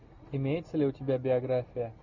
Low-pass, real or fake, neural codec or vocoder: 7.2 kHz; real; none